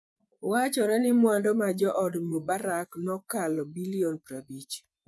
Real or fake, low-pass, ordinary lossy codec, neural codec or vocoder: fake; none; none; vocoder, 24 kHz, 100 mel bands, Vocos